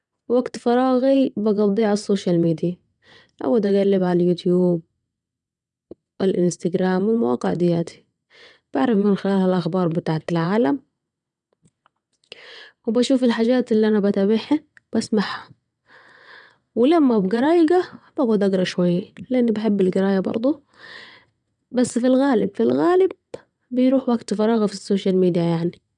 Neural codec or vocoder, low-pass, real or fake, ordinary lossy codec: vocoder, 22.05 kHz, 80 mel bands, WaveNeXt; 9.9 kHz; fake; none